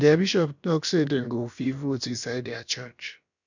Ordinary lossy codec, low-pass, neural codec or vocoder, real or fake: none; 7.2 kHz; codec, 16 kHz, about 1 kbps, DyCAST, with the encoder's durations; fake